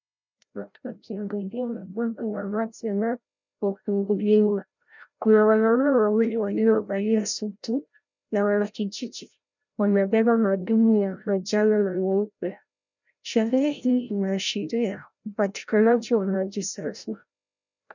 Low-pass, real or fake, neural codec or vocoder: 7.2 kHz; fake; codec, 16 kHz, 0.5 kbps, FreqCodec, larger model